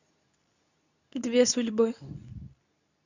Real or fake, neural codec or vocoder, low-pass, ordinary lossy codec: fake; codec, 24 kHz, 0.9 kbps, WavTokenizer, medium speech release version 2; 7.2 kHz; none